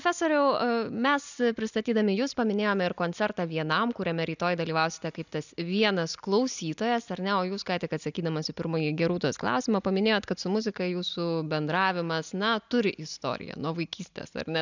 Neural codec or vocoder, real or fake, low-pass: none; real; 7.2 kHz